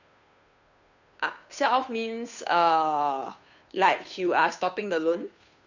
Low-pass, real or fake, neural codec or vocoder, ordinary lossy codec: 7.2 kHz; fake; codec, 16 kHz, 2 kbps, FunCodec, trained on Chinese and English, 25 frames a second; none